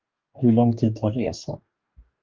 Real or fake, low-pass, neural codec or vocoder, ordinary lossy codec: fake; 7.2 kHz; codec, 44.1 kHz, 2.6 kbps, DAC; Opus, 32 kbps